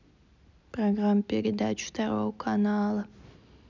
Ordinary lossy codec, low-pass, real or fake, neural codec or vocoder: none; 7.2 kHz; real; none